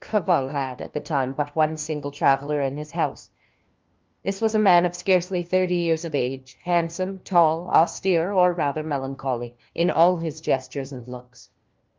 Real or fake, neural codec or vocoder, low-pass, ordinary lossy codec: fake; codec, 16 kHz, 1 kbps, FunCodec, trained on LibriTTS, 50 frames a second; 7.2 kHz; Opus, 24 kbps